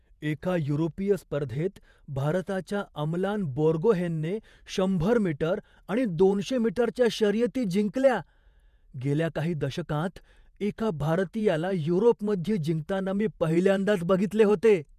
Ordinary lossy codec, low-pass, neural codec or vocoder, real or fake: none; 14.4 kHz; vocoder, 48 kHz, 128 mel bands, Vocos; fake